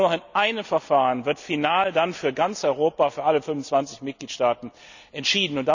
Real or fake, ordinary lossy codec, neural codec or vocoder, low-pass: real; none; none; 7.2 kHz